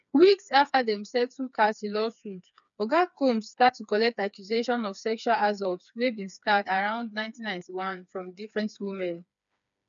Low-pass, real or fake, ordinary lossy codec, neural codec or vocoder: 7.2 kHz; fake; none; codec, 16 kHz, 4 kbps, FreqCodec, smaller model